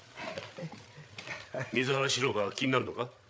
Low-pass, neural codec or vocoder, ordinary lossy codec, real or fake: none; codec, 16 kHz, 8 kbps, FreqCodec, larger model; none; fake